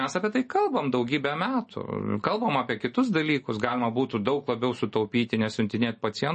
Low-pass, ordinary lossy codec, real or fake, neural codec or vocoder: 9.9 kHz; MP3, 32 kbps; real; none